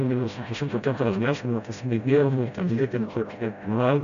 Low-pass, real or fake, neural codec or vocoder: 7.2 kHz; fake; codec, 16 kHz, 0.5 kbps, FreqCodec, smaller model